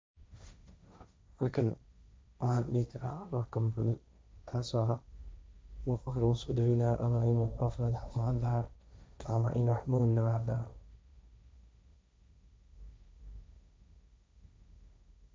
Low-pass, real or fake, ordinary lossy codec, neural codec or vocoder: none; fake; none; codec, 16 kHz, 1.1 kbps, Voila-Tokenizer